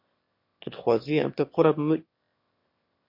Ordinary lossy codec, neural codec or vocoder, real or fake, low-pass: MP3, 32 kbps; autoencoder, 22.05 kHz, a latent of 192 numbers a frame, VITS, trained on one speaker; fake; 5.4 kHz